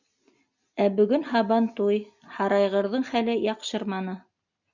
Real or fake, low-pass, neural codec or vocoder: real; 7.2 kHz; none